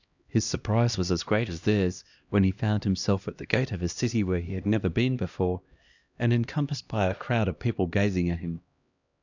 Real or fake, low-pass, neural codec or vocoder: fake; 7.2 kHz; codec, 16 kHz, 1 kbps, X-Codec, HuBERT features, trained on LibriSpeech